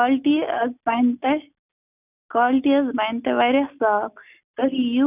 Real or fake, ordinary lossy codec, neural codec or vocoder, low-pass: real; none; none; 3.6 kHz